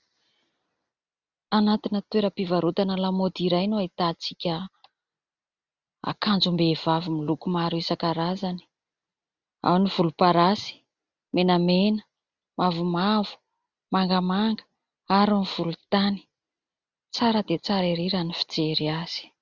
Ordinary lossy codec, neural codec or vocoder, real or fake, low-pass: Opus, 64 kbps; none; real; 7.2 kHz